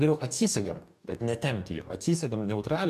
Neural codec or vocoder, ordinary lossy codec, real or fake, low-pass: codec, 44.1 kHz, 2.6 kbps, DAC; MP3, 96 kbps; fake; 14.4 kHz